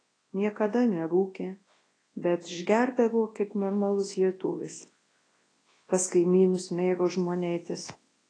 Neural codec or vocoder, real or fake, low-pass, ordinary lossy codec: codec, 24 kHz, 0.9 kbps, WavTokenizer, large speech release; fake; 9.9 kHz; AAC, 32 kbps